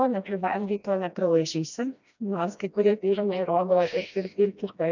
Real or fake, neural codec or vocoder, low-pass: fake; codec, 16 kHz, 1 kbps, FreqCodec, smaller model; 7.2 kHz